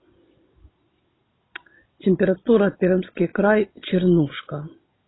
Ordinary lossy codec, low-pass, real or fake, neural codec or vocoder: AAC, 16 kbps; 7.2 kHz; real; none